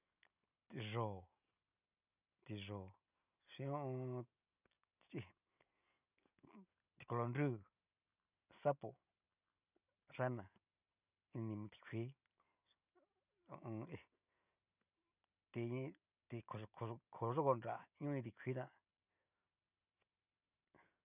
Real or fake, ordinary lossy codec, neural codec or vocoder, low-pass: real; none; none; 3.6 kHz